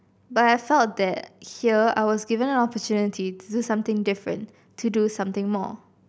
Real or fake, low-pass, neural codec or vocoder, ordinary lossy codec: real; none; none; none